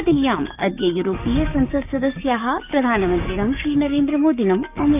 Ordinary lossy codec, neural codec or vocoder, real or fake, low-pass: none; codec, 16 kHz, 6 kbps, DAC; fake; 3.6 kHz